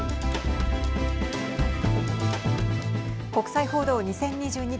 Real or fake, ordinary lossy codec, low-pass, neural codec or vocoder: real; none; none; none